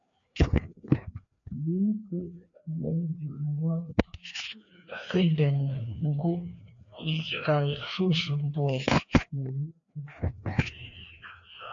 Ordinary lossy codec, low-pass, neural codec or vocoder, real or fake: AAC, 64 kbps; 7.2 kHz; codec, 16 kHz, 2 kbps, FreqCodec, larger model; fake